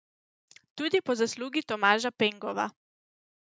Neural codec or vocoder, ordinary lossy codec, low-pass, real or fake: none; none; none; real